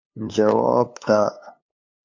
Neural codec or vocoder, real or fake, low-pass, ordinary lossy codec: codec, 16 kHz, 2 kbps, FunCodec, trained on LibriTTS, 25 frames a second; fake; 7.2 kHz; MP3, 48 kbps